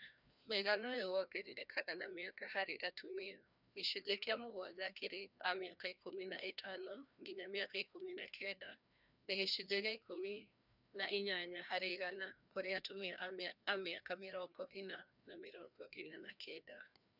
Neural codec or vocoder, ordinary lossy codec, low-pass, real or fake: codec, 16 kHz, 1 kbps, FreqCodec, larger model; none; 5.4 kHz; fake